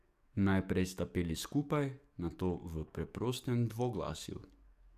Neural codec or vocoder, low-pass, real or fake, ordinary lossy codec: codec, 44.1 kHz, 7.8 kbps, DAC; 14.4 kHz; fake; AAC, 96 kbps